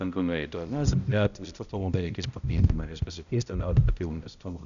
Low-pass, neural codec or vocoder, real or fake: 7.2 kHz; codec, 16 kHz, 0.5 kbps, X-Codec, HuBERT features, trained on balanced general audio; fake